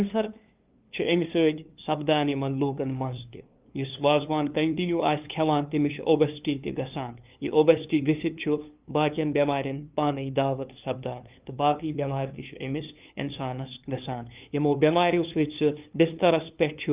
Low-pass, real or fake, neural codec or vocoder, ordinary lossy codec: 3.6 kHz; fake; codec, 16 kHz, 2 kbps, FunCodec, trained on LibriTTS, 25 frames a second; Opus, 24 kbps